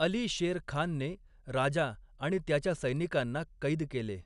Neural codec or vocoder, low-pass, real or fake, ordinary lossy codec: none; 10.8 kHz; real; none